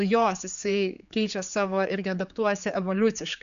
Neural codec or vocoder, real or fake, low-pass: codec, 16 kHz, 4 kbps, X-Codec, HuBERT features, trained on general audio; fake; 7.2 kHz